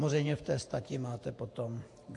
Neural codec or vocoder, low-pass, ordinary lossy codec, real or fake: vocoder, 48 kHz, 128 mel bands, Vocos; 10.8 kHz; AAC, 48 kbps; fake